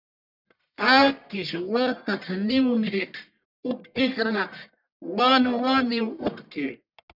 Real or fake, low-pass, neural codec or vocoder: fake; 5.4 kHz; codec, 44.1 kHz, 1.7 kbps, Pupu-Codec